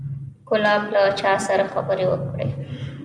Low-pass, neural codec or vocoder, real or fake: 9.9 kHz; none; real